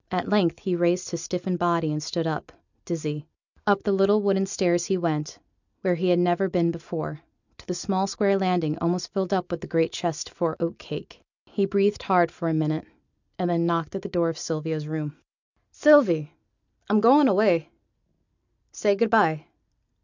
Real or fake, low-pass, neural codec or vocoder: real; 7.2 kHz; none